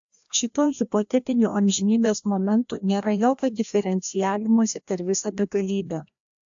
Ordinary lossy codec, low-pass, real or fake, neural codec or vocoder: AAC, 64 kbps; 7.2 kHz; fake; codec, 16 kHz, 1 kbps, FreqCodec, larger model